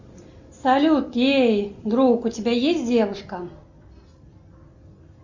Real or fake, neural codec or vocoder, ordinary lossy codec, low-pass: real; none; Opus, 64 kbps; 7.2 kHz